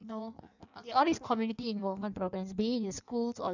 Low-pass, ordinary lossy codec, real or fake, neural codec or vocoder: 7.2 kHz; none; fake; codec, 16 kHz in and 24 kHz out, 1.1 kbps, FireRedTTS-2 codec